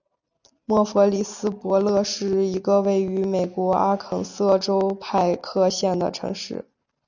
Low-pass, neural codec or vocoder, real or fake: 7.2 kHz; none; real